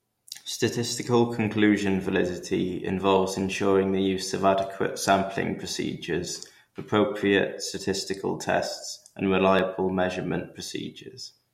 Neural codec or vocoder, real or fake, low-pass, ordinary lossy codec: none; real; 19.8 kHz; MP3, 64 kbps